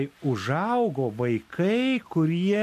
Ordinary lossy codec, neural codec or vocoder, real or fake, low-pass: MP3, 64 kbps; none; real; 14.4 kHz